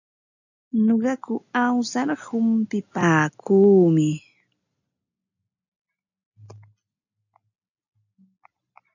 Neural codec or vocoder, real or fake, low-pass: none; real; 7.2 kHz